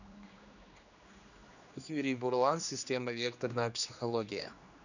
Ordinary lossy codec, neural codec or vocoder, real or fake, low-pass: none; codec, 16 kHz, 2 kbps, X-Codec, HuBERT features, trained on general audio; fake; 7.2 kHz